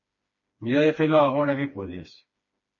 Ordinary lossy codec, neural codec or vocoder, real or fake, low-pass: MP3, 32 kbps; codec, 16 kHz, 2 kbps, FreqCodec, smaller model; fake; 7.2 kHz